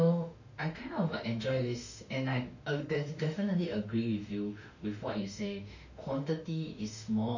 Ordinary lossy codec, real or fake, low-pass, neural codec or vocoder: none; fake; 7.2 kHz; autoencoder, 48 kHz, 32 numbers a frame, DAC-VAE, trained on Japanese speech